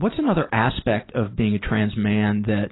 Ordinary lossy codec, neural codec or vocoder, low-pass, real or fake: AAC, 16 kbps; none; 7.2 kHz; real